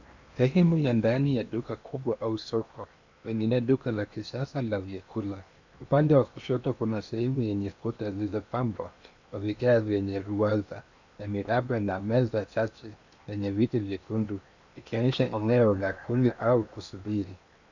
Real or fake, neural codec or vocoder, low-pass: fake; codec, 16 kHz in and 24 kHz out, 0.8 kbps, FocalCodec, streaming, 65536 codes; 7.2 kHz